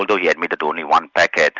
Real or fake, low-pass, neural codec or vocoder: real; 7.2 kHz; none